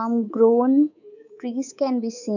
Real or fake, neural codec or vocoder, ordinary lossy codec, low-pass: fake; codec, 24 kHz, 3.1 kbps, DualCodec; none; 7.2 kHz